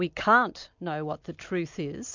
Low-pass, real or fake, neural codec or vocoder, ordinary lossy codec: 7.2 kHz; real; none; MP3, 64 kbps